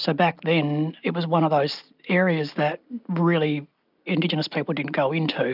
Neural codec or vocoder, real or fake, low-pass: none; real; 5.4 kHz